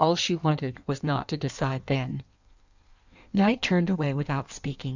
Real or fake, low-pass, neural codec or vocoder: fake; 7.2 kHz; codec, 16 kHz in and 24 kHz out, 1.1 kbps, FireRedTTS-2 codec